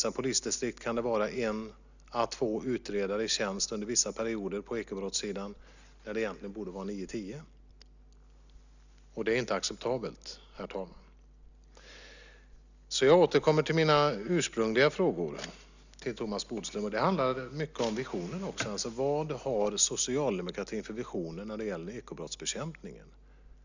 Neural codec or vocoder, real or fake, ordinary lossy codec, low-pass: none; real; none; 7.2 kHz